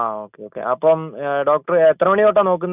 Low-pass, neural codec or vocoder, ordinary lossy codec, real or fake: 3.6 kHz; none; none; real